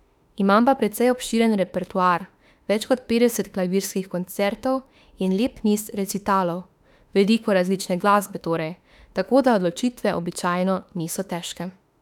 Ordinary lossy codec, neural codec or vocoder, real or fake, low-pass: none; autoencoder, 48 kHz, 32 numbers a frame, DAC-VAE, trained on Japanese speech; fake; 19.8 kHz